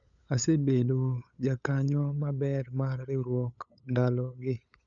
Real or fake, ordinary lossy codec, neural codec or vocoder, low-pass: fake; none; codec, 16 kHz, 8 kbps, FunCodec, trained on LibriTTS, 25 frames a second; 7.2 kHz